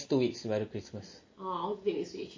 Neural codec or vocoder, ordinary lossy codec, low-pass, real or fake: none; MP3, 32 kbps; 7.2 kHz; real